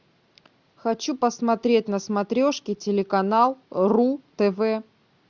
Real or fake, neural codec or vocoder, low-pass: real; none; 7.2 kHz